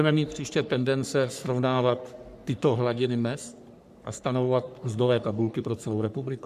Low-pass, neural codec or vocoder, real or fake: 14.4 kHz; codec, 44.1 kHz, 3.4 kbps, Pupu-Codec; fake